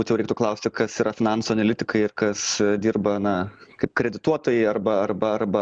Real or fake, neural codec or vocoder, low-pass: real; none; 9.9 kHz